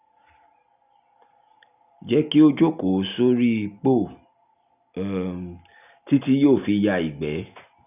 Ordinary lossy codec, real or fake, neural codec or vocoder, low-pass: none; real; none; 3.6 kHz